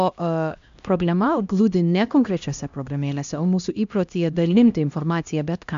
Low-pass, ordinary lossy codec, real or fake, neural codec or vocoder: 7.2 kHz; MP3, 64 kbps; fake; codec, 16 kHz, 1 kbps, X-Codec, HuBERT features, trained on LibriSpeech